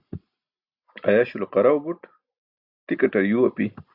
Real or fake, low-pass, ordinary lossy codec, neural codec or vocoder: real; 5.4 kHz; AAC, 48 kbps; none